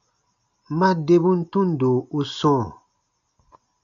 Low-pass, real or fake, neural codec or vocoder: 7.2 kHz; real; none